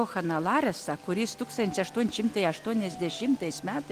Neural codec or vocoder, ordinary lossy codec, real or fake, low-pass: none; Opus, 24 kbps; real; 14.4 kHz